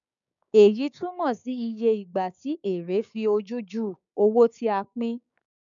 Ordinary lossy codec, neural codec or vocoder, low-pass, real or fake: none; codec, 16 kHz, 6 kbps, DAC; 7.2 kHz; fake